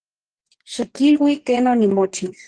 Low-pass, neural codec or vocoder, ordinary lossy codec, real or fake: 9.9 kHz; codec, 44.1 kHz, 2.6 kbps, DAC; Opus, 16 kbps; fake